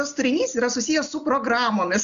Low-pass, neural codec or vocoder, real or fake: 7.2 kHz; none; real